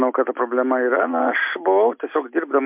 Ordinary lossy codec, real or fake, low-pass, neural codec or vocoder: MP3, 32 kbps; real; 3.6 kHz; none